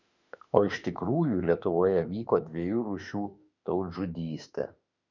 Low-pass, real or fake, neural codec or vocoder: 7.2 kHz; fake; autoencoder, 48 kHz, 32 numbers a frame, DAC-VAE, trained on Japanese speech